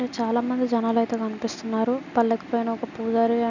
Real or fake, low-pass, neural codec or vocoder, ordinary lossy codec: real; 7.2 kHz; none; none